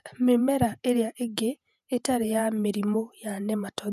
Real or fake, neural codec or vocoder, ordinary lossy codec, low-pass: fake; vocoder, 48 kHz, 128 mel bands, Vocos; none; 14.4 kHz